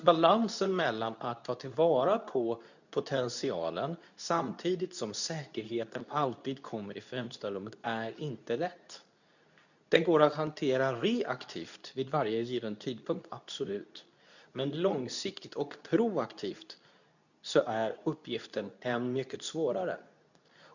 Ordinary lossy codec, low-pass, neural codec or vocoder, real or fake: none; 7.2 kHz; codec, 24 kHz, 0.9 kbps, WavTokenizer, medium speech release version 2; fake